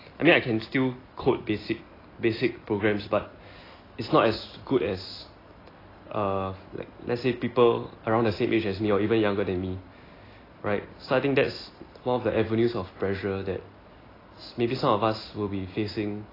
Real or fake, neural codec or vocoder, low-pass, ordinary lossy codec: real; none; 5.4 kHz; AAC, 24 kbps